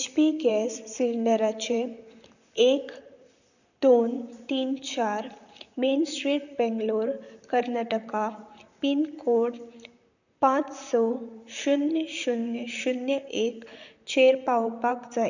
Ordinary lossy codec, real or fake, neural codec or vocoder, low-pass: none; fake; vocoder, 44.1 kHz, 128 mel bands, Pupu-Vocoder; 7.2 kHz